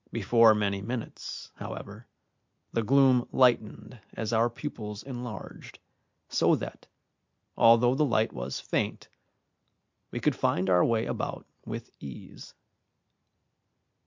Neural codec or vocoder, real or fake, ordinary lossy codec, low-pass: none; real; MP3, 64 kbps; 7.2 kHz